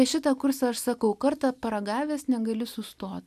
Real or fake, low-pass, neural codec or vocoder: real; 14.4 kHz; none